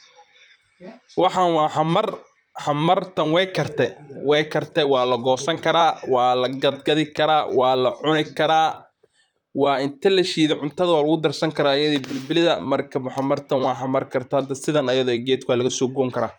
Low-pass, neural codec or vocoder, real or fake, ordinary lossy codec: 19.8 kHz; vocoder, 44.1 kHz, 128 mel bands, Pupu-Vocoder; fake; none